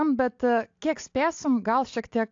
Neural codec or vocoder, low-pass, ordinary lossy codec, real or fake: none; 7.2 kHz; AAC, 48 kbps; real